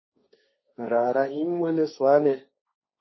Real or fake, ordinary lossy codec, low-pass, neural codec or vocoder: fake; MP3, 24 kbps; 7.2 kHz; codec, 16 kHz, 1.1 kbps, Voila-Tokenizer